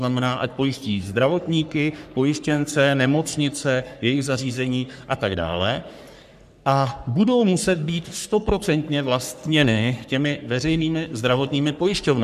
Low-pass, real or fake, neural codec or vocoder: 14.4 kHz; fake; codec, 44.1 kHz, 3.4 kbps, Pupu-Codec